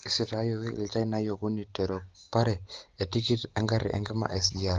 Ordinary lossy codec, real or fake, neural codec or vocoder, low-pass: Opus, 16 kbps; real; none; 7.2 kHz